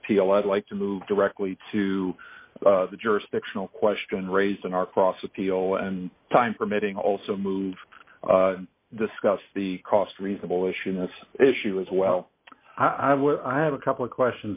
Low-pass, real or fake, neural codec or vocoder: 3.6 kHz; real; none